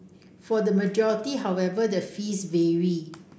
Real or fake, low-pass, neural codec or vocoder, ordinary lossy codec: real; none; none; none